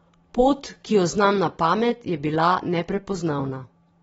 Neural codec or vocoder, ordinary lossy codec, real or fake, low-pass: none; AAC, 24 kbps; real; 19.8 kHz